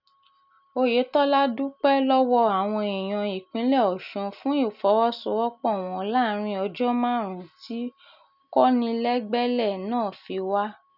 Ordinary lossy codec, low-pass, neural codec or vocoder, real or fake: none; 5.4 kHz; none; real